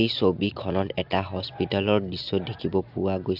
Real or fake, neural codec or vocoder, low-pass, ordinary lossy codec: real; none; 5.4 kHz; AAC, 48 kbps